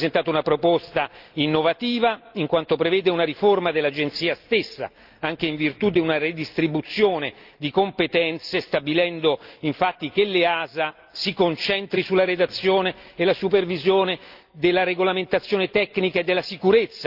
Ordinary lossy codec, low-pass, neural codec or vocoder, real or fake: Opus, 32 kbps; 5.4 kHz; none; real